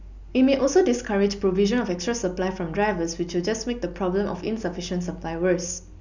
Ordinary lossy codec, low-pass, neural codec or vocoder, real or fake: none; 7.2 kHz; none; real